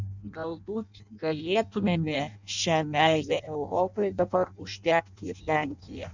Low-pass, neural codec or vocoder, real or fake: 7.2 kHz; codec, 16 kHz in and 24 kHz out, 0.6 kbps, FireRedTTS-2 codec; fake